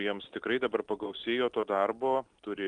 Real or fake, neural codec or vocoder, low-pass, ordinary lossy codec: real; none; 9.9 kHz; Opus, 32 kbps